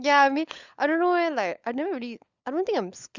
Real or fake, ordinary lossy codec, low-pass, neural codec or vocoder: fake; Opus, 64 kbps; 7.2 kHz; codec, 16 kHz, 16 kbps, FunCodec, trained on LibriTTS, 50 frames a second